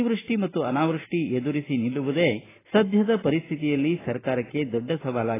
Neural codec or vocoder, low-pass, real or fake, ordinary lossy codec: none; 3.6 kHz; real; AAC, 16 kbps